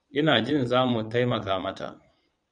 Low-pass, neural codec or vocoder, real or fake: 9.9 kHz; vocoder, 22.05 kHz, 80 mel bands, Vocos; fake